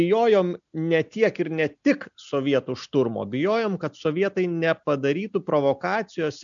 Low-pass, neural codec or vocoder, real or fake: 7.2 kHz; none; real